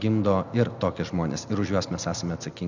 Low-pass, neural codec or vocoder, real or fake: 7.2 kHz; none; real